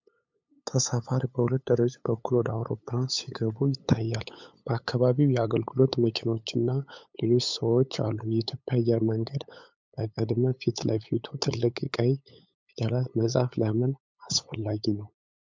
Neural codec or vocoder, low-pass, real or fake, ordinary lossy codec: codec, 16 kHz, 8 kbps, FunCodec, trained on LibriTTS, 25 frames a second; 7.2 kHz; fake; MP3, 64 kbps